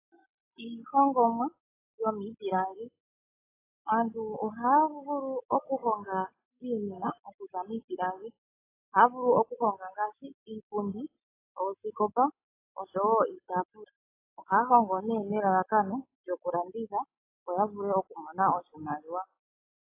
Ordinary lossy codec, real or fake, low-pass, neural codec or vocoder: AAC, 24 kbps; real; 3.6 kHz; none